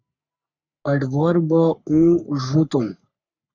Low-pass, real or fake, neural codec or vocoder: 7.2 kHz; fake; codec, 44.1 kHz, 3.4 kbps, Pupu-Codec